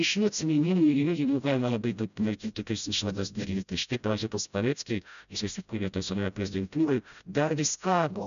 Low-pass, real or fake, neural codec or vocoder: 7.2 kHz; fake; codec, 16 kHz, 0.5 kbps, FreqCodec, smaller model